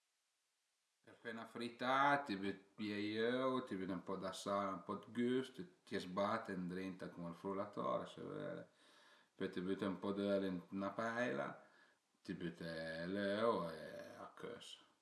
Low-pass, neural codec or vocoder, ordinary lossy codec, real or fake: none; none; none; real